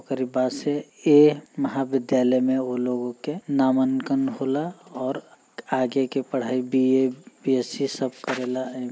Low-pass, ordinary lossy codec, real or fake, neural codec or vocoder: none; none; real; none